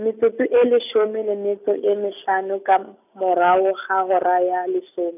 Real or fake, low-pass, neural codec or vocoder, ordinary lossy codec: real; 3.6 kHz; none; none